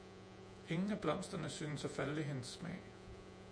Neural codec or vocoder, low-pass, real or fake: vocoder, 48 kHz, 128 mel bands, Vocos; 9.9 kHz; fake